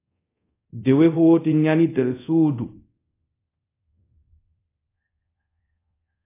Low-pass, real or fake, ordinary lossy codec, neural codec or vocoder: 3.6 kHz; fake; AAC, 24 kbps; codec, 24 kHz, 0.5 kbps, DualCodec